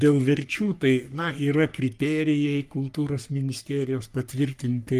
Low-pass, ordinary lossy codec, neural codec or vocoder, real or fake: 14.4 kHz; Opus, 32 kbps; codec, 44.1 kHz, 3.4 kbps, Pupu-Codec; fake